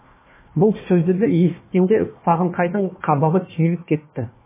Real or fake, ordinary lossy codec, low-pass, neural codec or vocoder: fake; MP3, 16 kbps; 3.6 kHz; codec, 16 kHz, 1 kbps, FunCodec, trained on Chinese and English, 50 frames a second